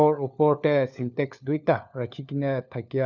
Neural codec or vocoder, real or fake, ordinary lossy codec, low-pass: codec, 16 kHz, 4 kbps, FunCodec, trained on LibriTTS, 50 frames a second; fake; none; 7.2 kHz